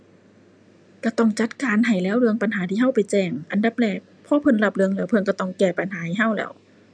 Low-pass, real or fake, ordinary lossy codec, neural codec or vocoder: 9.9 kHz; real; none; none